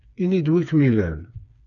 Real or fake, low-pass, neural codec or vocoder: fake; 7.2 kHz; codec, 16 kHz, 4 kbps, FreqCodec, smaller model